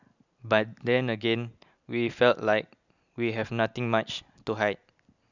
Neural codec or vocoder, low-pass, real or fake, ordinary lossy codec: none; 7.2 kHz; real; none